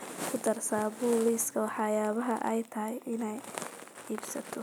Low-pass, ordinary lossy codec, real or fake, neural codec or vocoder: none; none; real; none